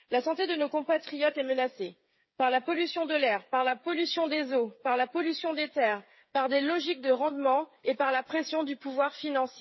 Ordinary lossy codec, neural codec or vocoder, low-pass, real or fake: MP3, 24 kbps; codec, 16 kHz, 8 kbps, FreqCodec, smaller model; 7.2 kHz; fake